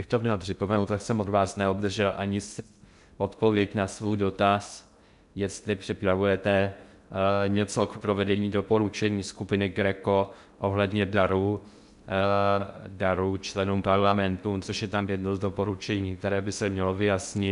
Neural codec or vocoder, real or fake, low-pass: codec, 16 kHz in and 24 kHz out, 0.6 kbps, FocalCodec, streaming, 2048 codes; fake; 10.8 kHz